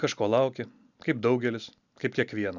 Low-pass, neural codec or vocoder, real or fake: 7.2 kHz; none; real